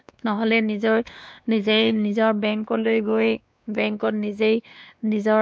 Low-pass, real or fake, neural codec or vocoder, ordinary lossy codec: none; fake; codec, 16 kHz, 2 kbps, X-Codec, WavLM features, trained on Multilingual LibriSpeech; none